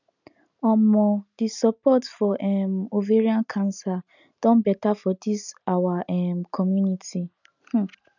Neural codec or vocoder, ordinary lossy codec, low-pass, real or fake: none; none; 7.2 kHz; real